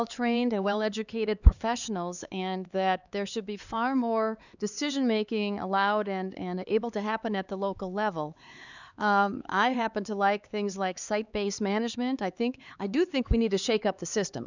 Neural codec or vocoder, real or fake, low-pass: codec, 16 kHz, 4 kbps, X-Codec, HuBERT features, trained on LibriSpeech; fake; 7.2 kHz